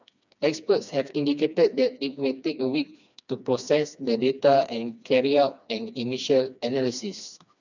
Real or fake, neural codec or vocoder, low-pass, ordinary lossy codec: fake; codec, 16 kHz, 2 kbps, FreqCodec, smaller model; 7.2 kHz; none